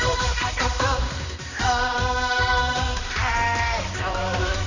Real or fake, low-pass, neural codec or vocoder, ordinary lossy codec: fake; 7.2 kHz; codec, 16 kHz, 2 kbps, X-Codec, HuBERT features, trained on balanced general audio; none